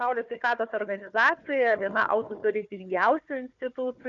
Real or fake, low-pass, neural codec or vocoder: fake; 7.2 kHz; codec, 16 kHz, 4 kbps, FunCodec, trained on Chinese and English, 50 frames a second